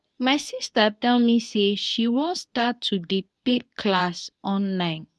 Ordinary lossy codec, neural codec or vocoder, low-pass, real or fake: none; codec, 24 kHz, 0.9 kbps, WavTokenizer, medium speech release version 2; none; fake